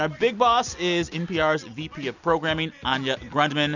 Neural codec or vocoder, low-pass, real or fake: none; 7.2 kHz; real